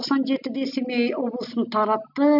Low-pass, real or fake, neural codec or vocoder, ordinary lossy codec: 5.4 kHz; real; none; none